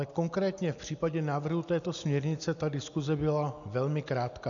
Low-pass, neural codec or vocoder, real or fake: 7.2 kHz; none; real